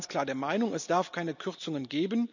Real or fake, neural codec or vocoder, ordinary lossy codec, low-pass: real; none; none; 7.2 kHz